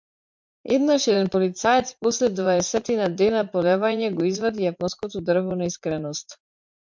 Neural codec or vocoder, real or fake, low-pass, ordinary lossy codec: vocoder, 44.1 kHz, 80 mel bands, Vocos; fake; 7.2 kHz; MP3, 64 kbps